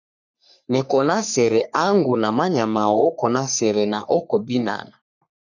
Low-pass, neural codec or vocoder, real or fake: 7.2 kHz; codec, 44.1 kHz, 3.4 kbps, Pupu-Codec; fake